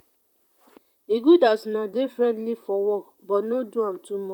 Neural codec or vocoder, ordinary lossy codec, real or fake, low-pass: vocoder, 44.1 kHz, 128 mel bands, Pupu-Vocoder; none; fake; 19.8 kHz